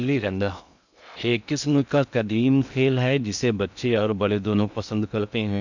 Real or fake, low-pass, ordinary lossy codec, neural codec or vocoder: fake; 7.2 kHz; none; codec, 16 kHz in and 24 kHz out, 0.6 kbps, FocalCodec, streaming, 2048 codes